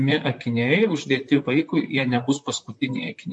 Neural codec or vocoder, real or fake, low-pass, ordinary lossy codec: vocoder, 44.1 kHz, 128 mel bands, Pupu-Vocoder; fake; 10.8 kHz; MP3, 48 kbps